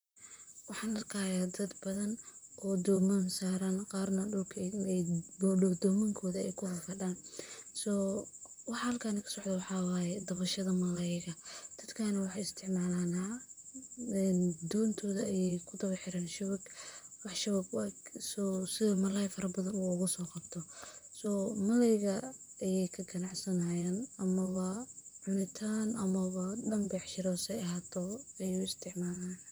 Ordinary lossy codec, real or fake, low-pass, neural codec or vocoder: none; fake; none; vocoder, 44.1 kHz, 128 mel bands, Pupu-Vocoder